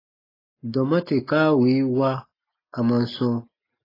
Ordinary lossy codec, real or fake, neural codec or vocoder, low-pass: AAC, 24 kbps; real; none; 5.4 kHz